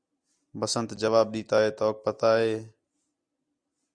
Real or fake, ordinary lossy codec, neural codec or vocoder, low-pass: real; Opus, 64 kbps; none; 9.9 kHz